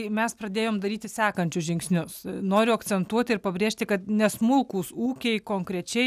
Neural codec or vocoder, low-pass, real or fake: none; 14.4 kHz; real